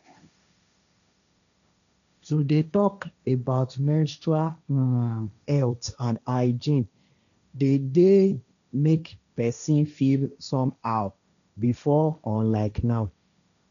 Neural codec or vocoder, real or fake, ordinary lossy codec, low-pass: codec, 16 kHz, 1.1 kbps, Voila-Tokenizer; fake; none; 7.2 kHz